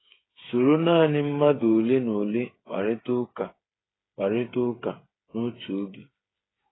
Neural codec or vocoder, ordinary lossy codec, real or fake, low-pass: codec, 16 kHz, 8 kbps, FreqCodec, smaller model; AAC, 16 kbps; fake; 7.2 kHz